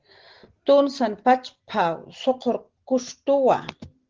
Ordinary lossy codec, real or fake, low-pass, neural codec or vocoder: Opus, 16 kbps; real; 7.2 kHz; none